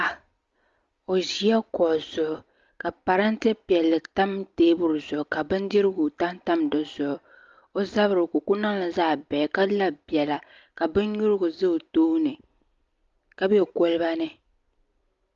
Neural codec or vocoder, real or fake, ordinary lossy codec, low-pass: none; real; Opus, 32 kbps; 7.2 kHz